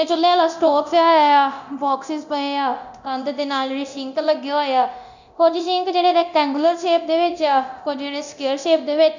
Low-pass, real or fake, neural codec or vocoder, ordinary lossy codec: 7.2 kHz; fake; codec, 24 kHz, 0.9 kbps, DualCodec; none